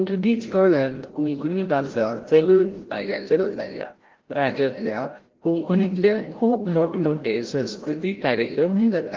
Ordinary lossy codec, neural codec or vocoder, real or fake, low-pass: Opus, 16 kbps; codec, 16 kHz, 0.5 kbps, FreqCodec, larger model; fake; 7.2 kHz